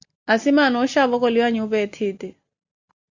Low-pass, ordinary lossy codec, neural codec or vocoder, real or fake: 7.2 kHz; Opus, 64 kbps; none; real